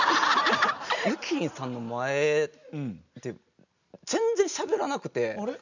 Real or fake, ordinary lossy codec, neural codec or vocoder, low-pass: real; none; none; 7.2 kHz